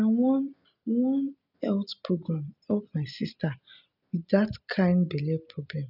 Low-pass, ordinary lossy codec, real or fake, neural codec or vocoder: 5.4 kHz; none; real; none